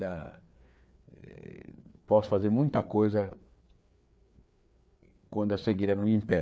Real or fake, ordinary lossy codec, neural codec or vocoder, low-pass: fake; none; codec, 16 kHz, 4 kbps, FreqCodec, larger model; none